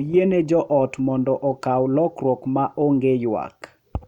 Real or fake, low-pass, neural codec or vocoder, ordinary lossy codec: real; 19.8 kHz; none; none